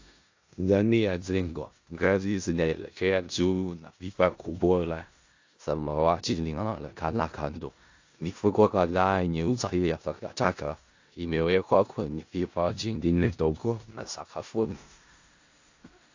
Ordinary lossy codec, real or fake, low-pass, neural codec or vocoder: AAC, 48 kbps; fake; 7.2 kHz; codec, 16 kHz in and 24 kHz out, 0.4 kbps, LongCat-Audio-Codec, four codebook decoder